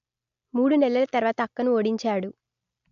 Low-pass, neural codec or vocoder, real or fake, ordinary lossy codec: 7.2 kHz; none; real; AAC, 64 kbps